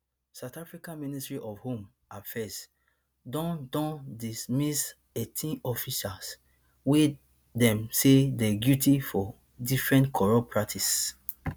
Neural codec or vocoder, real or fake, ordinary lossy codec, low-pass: none; real; none; none